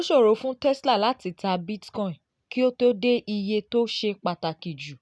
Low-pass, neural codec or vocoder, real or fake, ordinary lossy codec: none; none; real; none